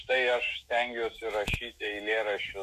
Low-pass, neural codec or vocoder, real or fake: 14.4 kHz; autoencoder, 48 kHz, 128 numbers a frame, DAC-VAE, trained on Japanese speech; fake